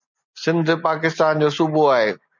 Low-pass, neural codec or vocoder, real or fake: 7.2 kHz; none; real